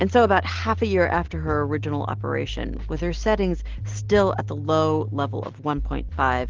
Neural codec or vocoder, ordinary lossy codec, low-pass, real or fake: none; Opus, 16 kbps; 7.2 kHz; real